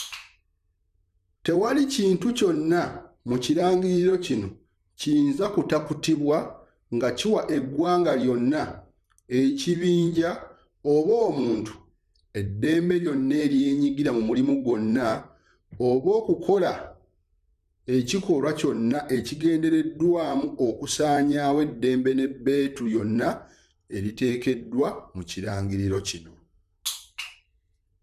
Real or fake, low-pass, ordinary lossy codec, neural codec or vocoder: fake; 14.4 kHz; none; vocoder, 44.1 kHz, 128 mel bands, Pupu-Vocoder